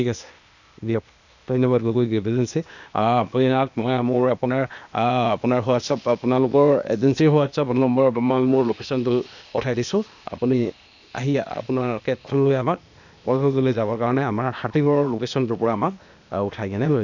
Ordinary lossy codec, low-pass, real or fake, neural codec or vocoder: none; 7.2 kHz; fake; codec, 16 kHz, 0.8 kbps, ZipCodec